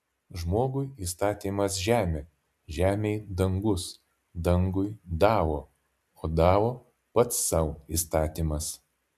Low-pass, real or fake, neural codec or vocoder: 14.4 kHz; real; none